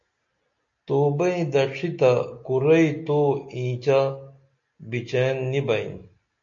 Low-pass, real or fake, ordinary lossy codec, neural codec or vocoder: 7.2 kHz; real; MP3, 48 kbps; none